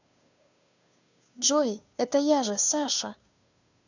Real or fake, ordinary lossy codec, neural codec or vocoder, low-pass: fake; none; codec, 16 kHz, 2 kbps, FunCodec, trained on Chinese and English, 25 frames a second; 7.2 kHz